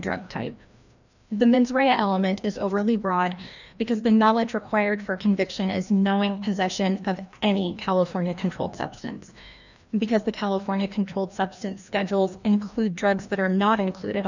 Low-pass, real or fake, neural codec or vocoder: 7.2 kHz; fake; codec, 16 kHz, 1 kbps, FreqCodec, larger model